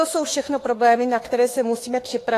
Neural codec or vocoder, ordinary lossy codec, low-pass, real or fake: autoencoder, 48 kHz, 32 numbers a frame, DAC-VAE, trained on Japanese speech; AAC, 48 kbps; 14.4 kHz; fake